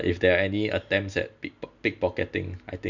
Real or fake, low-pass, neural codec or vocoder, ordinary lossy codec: real; 7.2 kHz; none; none